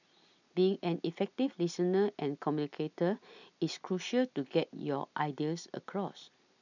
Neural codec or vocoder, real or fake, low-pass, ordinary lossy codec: none; real; 7.2 kHz; none